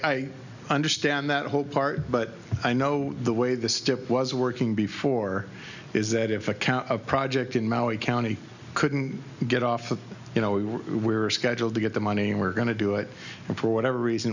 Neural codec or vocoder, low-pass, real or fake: none; 7.2 kHz; real